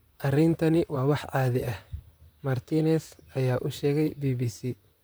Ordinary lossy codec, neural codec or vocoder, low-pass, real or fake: none; vocoder, 44.1 kHz, 128 mel bands, Pupu-Vocoder; none; fake